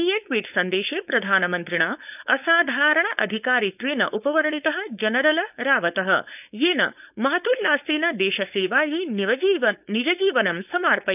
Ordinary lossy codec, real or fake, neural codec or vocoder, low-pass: none; fake; codec, 16 kHz, 4.8 kbps, FACodec; 3.6 kHz